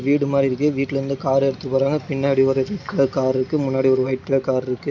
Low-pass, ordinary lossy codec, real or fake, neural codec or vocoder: 7.2 kHz; MP3, 64 kbps; real; none